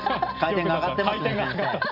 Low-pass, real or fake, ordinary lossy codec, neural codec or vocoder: 5.4 kHz; real; AAC, 48 kbps; none